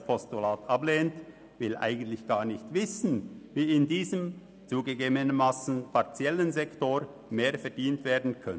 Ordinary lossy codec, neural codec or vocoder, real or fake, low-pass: none; none; real; none